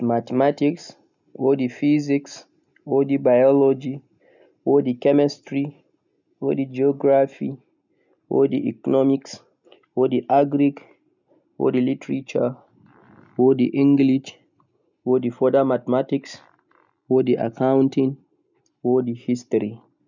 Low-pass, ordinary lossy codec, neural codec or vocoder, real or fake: 7.2 kHz; none; none; real